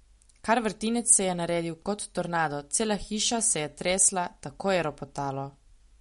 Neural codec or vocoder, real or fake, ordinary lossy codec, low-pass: none; real; MP3, 48 kbps; 14.4 kHz